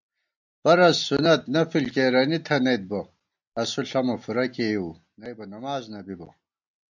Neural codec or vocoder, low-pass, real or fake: none; 7.2 kHz; real